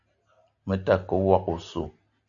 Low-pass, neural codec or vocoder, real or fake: 7.2 kHz; none; real